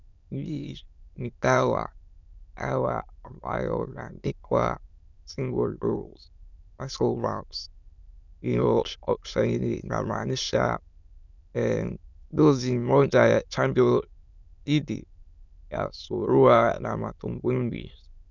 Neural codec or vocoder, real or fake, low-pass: autoencoder, 22.05 kHz, a latent of 192 numbers a frame, VITS, trained on many speakers; fake; 7.2 kHz